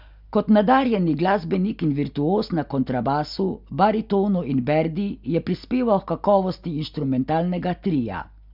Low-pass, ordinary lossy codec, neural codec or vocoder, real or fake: 5.4 kHz; none; none; real